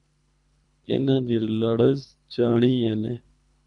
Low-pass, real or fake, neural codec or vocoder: 10.8 kHz; fake; codec, 24 kHz, 3 kbps, HILCodec